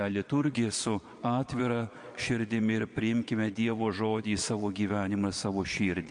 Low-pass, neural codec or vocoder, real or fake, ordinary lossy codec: 9.9 kHz; vocoder, 22.05 kHz, 80 mel bands, Vocos; fake; MP3, 48 kbps